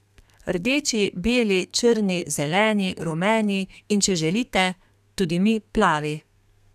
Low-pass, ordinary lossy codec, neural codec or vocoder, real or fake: 14.4 kHz; none; codec, 32 kHz, 1.9 kbps, SNAC; fake